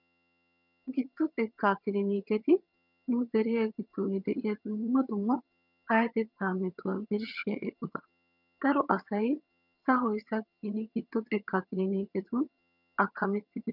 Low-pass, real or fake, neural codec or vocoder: 5.4 kHz; fake; vocoder, 22.05 kHz, 80 mel bands, HiFi-GAN